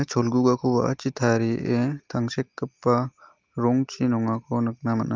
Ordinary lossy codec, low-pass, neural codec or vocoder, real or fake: Opus, 32 kbps; 7.2 kHz; none; real